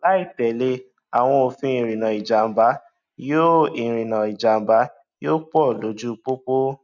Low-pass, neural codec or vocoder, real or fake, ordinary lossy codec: 7.2 kHz; none; real; none